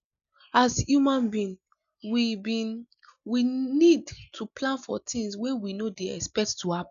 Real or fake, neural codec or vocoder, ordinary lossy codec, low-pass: real; none; none; 7.2 kHz